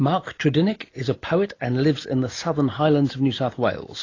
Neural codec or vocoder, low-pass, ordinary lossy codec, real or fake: none; 7.2 kHz; AAC, 32 kbps; real